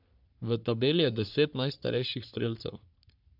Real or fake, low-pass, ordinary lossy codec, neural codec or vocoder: fake; 5.4 kHz; none; codec, 44.1 kHz, 3.4 kbps, Pupu-Codec